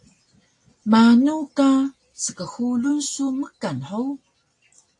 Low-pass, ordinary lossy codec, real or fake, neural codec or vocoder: 10.8 kHz; AAC, 48 kbps; real; none